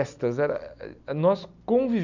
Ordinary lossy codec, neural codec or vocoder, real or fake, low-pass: none; none; real; 7.2 kHz